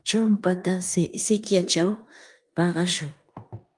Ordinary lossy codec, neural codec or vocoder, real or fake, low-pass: Opus, 32 kbps; codec, 16 kHz in and 24 kHz out, 0.9 kbps, LongCat-Audio-Codec, four codebook decoder; fake; 10.8 kHz